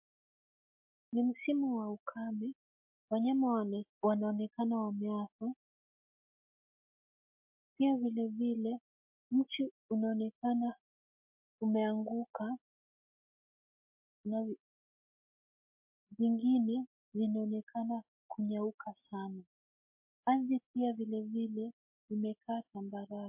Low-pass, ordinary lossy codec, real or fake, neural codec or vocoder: 3.6 kHz; MP3, 32 kbps; real; none